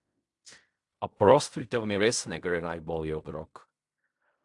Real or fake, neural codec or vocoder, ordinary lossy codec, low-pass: fake; codec, 16 kHz in and 24 kHz out, 0.4 kbps, LongCat-Audio-Codec, fine tuned four codebook decoder; AAC, 64 kbps; 10.8 kHz